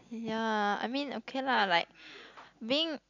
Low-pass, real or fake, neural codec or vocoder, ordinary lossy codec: 7.2 kHz; real; none; none